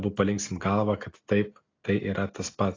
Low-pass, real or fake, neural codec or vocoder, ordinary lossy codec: 7.2 kHz; real; none; AAC, 32 kbps